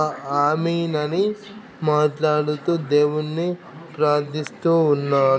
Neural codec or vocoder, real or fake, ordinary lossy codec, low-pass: none; real; none; none